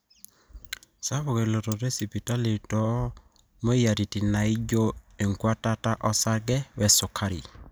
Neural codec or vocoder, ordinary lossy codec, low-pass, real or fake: none; none; none; real